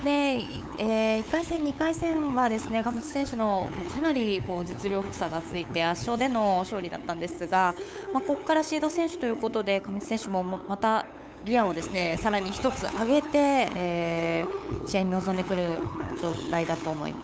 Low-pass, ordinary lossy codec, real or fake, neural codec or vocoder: none; none; fake; codec, 16 kHz, 8 kbps, FunCodec, trained on LibriTTS, 25 frames a second